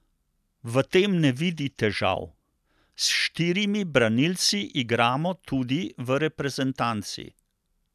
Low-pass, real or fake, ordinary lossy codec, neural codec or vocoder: 14.4 kHz; real; none; none